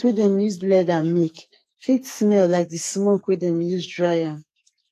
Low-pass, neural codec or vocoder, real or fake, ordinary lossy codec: 14.4 kHz; codec, 44.1 kHz, 2.6 kbps, SNAC; fake; AAC, 64 kbps